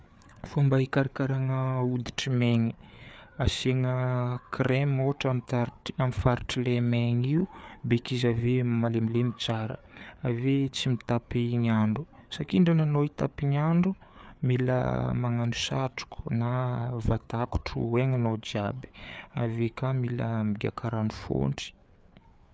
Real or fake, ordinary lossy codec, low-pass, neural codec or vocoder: fake; none; none; codec, 16 kHz, 4 kbps, FreqCodec, larger model